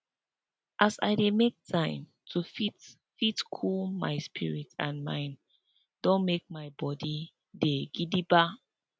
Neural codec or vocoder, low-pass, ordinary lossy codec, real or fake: none; none; none; real